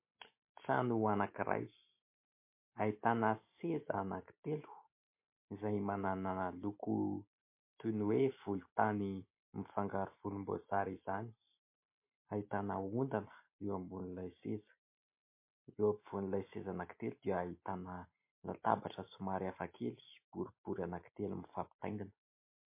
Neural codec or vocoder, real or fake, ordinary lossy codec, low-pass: none; real; MP3, 24 kbps; 3.6 kHz